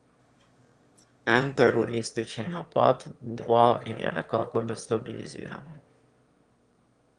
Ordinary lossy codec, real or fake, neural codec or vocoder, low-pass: Opus, 32 kbps; fake; autoencoder, 22.05 kHz, a latent of 192 numbers a frame, VITS, trained on one speaker; 9.9 kHz